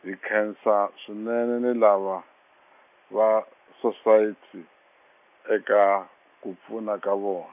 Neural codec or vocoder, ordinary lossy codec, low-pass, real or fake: none; none; 3.6 kHz; real